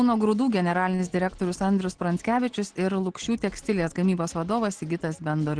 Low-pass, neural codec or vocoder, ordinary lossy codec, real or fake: 9.9 kHz; none; Opus, 16 kbps; real